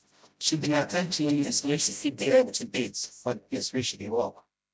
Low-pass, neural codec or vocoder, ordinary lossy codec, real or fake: none; codec, 16 kHz, 0.5 kbps, FreqCodec, smaller model; none; fake